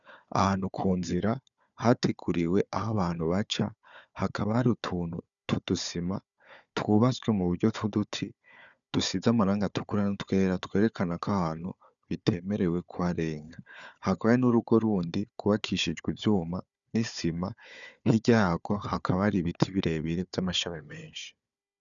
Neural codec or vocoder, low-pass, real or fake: codec, 16 kHz, 4 kbps, FunCodec, trained on Chinese and English, 50 frames a second; 7.2 kHz; fake